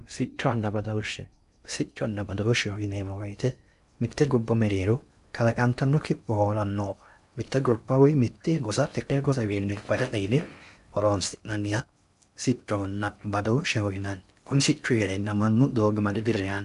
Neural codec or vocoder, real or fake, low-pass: codec, 16 kHz in and 24 kHz out, 0.6 kbps, FocalCodec, streaming, 2048 codes; fake; 10.8 kHz